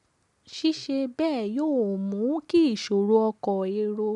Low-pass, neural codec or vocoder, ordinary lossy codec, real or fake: 10.8 kHz; none; none; real